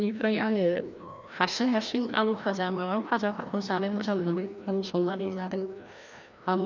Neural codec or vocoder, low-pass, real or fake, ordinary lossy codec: codec, 16 kHz, 1 kbps, FreqCodec, larger model; 7.2 kHz; fake; none